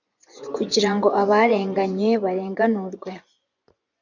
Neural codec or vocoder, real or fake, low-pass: vocoder, 24 kHz, 100 mel bands, Vocos; fake; 7.2 kHz